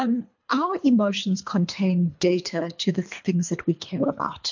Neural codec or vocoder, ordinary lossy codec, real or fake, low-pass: codec, 24 kHz, 3 kbps, HILCodec; MP3, 48 kbps; fake; 7.2 kHz